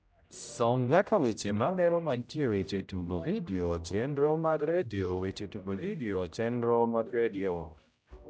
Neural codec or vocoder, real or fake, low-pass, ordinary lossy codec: codec, 16 kHz, 0.5 kbps, X-Codec, HuBERT features, trained on general audio; fake; none; none